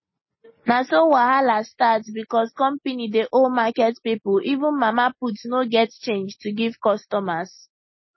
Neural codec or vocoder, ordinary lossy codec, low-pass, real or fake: none; MP3, 24 kbps; 7.2 kHz; real